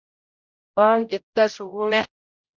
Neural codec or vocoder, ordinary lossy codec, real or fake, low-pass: codec, 16 kHz, 0.5 kbps, X-Codec, HuBERT features, trained on general audio; Opus, 64 kbps; fake; 7.2 kHz